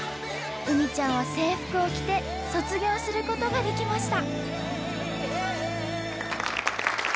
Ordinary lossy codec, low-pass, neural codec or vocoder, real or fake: none; none; none; real